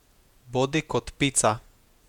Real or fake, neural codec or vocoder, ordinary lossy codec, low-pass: fake; vocoder, 48 kHz, 128 mel bands, Vocos; none; 19.8 kHz